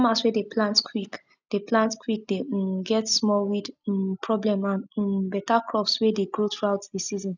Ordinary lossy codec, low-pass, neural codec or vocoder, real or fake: none; 7.2 kHz; none; real